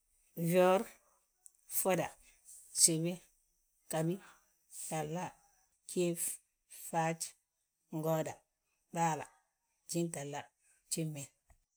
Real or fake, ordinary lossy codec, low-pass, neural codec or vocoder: real; none; none; none